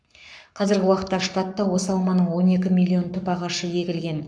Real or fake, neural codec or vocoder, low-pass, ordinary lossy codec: fake; codec, 44.1 kHz, 7.8 kbps, Pupu-Codec; 9.9 kHz; MP3, 96 kbps